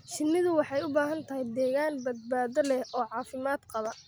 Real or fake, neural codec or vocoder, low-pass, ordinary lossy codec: real; none; none; none